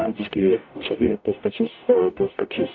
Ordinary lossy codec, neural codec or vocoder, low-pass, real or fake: AAC, 48 kbps; codec, 44.1 kHz, 0.9 kbps, DAC; 7.2 kHz; fake